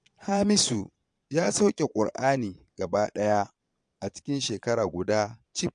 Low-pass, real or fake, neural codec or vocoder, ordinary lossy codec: 9.9 kHz; fake; vocoder, 22.05 kHz, 80 mel bands, Vocos; MP3, 64 kbps